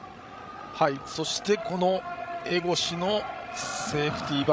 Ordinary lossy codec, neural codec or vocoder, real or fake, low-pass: none; codec, 16 kHz, 16 kbps, FreqCodec, larger model; fake; none